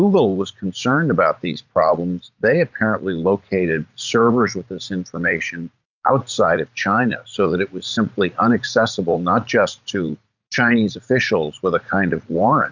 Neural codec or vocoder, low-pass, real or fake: vocoder, 44.1 kHz, 80 mel bands, Vocos; 7.2 kHz; fake